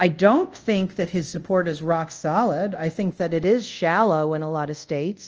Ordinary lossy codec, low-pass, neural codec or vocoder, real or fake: Opus, 24 kbps; 7.2 kHz; codec, 24 kHz, 0.5 kbps, DualCodec; fake